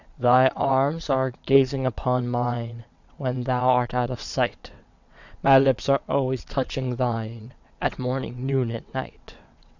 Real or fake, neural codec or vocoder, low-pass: fake; vocoder, 22.05 kHz, 80 mel bands, WaveNeXt; 7.2 kHz